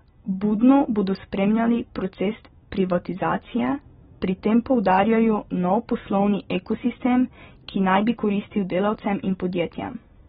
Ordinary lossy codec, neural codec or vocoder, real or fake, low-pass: AAC, 16 kbps; none; real; 9.9 kHz